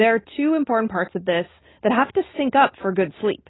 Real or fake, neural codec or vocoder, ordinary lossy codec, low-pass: real; none; AAC, 16 kbps; 7.2 kHz